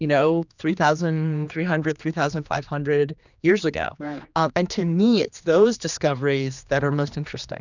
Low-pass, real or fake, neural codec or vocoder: 7.2 kHz; fake; codec, 16 kHz, 2 kbps, X-Codec, HuBERT features, trained on general audio